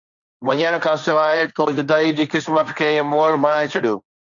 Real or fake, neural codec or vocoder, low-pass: fake; codec, 16 kHz, 1.1 kbps, Voila-Tokenizer; 7.2 kHz